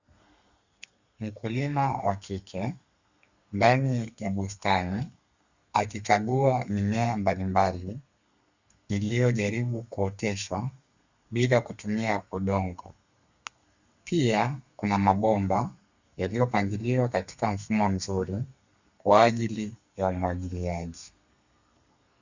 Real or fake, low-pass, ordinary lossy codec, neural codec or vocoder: fake; 7.2 kHz; Opus, 64 kbps; codec, 32 kHz, 1.9 kbps, SNAC